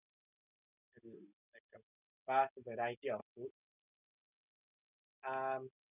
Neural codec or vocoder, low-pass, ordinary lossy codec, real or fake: none; 3.6 kHz; none; real